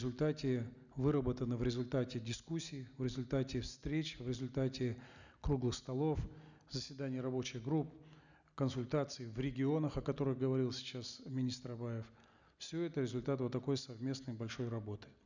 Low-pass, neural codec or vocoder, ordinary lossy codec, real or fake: 7.2 kHz; none; none; real